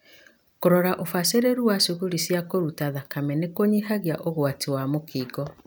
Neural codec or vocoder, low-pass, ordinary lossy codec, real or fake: none; none; none; real